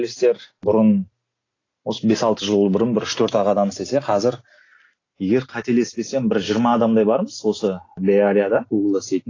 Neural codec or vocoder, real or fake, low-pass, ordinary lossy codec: none; real; 7.2 kHz; AAC, 32 kbps